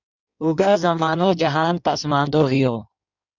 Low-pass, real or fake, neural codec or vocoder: 7.2 kHz; fake; codec, 16 kHz in and 24 kHz out, 1.1 kbps, FireRedTTS-2 codec